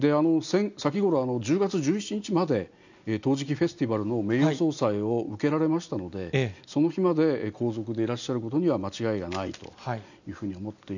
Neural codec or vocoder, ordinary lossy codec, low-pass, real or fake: none; none; 7.2 kHz; real